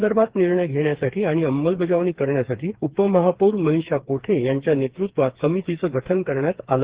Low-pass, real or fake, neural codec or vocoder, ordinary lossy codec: 3.6 kHz; fake; codec, 16 kHz, 4 kbps, FreqCodec, smaller model; Opus, 16 kbps